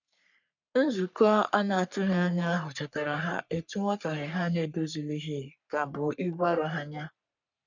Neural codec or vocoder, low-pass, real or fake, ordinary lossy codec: codec, 44.1 kHz, 3.4 kbps, Pupu-Codec; 7.2 kHz; fake; none